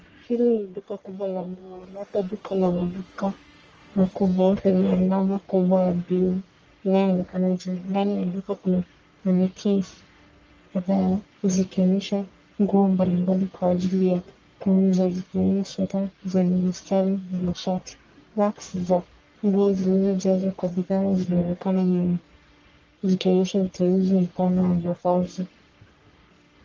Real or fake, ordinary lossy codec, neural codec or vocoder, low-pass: fake; Opus, 24 kbps; codec, 44.1 kHz, 1.7 kbps, Pupu-Codec; 7.2 kHz